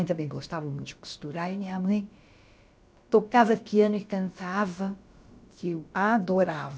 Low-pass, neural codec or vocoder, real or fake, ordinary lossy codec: none; codec, 16 kHz, about 1 kbps, DyCAST, with the encoder's durations; fake; none